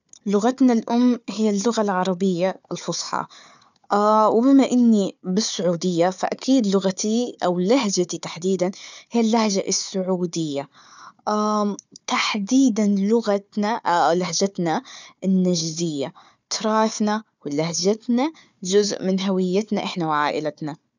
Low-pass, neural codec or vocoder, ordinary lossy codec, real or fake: 7.2 kHz; codec, 16 kHz, 4 kbps, FunCodec, trained on Chinese and English, 50 frames a second; none; fake